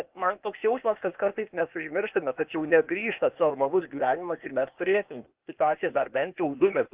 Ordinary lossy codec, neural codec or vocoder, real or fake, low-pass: Opus, 24 kbps; codec, 16 kHz, 0.8 kbps, ZipCodec; fake; 3.6 kHz